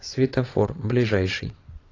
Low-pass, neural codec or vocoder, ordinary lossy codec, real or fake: 7.2 kHz; vocoder, 22.05 kHz, 80 mel bands, WaveNeXt; AAC, 32 kbps; fake